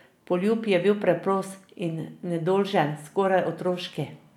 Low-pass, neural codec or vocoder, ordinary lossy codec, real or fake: 19.8 kHz; none; none; real